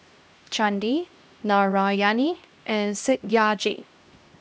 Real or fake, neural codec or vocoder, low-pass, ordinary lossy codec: fake; codec, 16 kHz, 1 kbps, X-Codec, HuBERT features, trained on LibriSpeech; none; none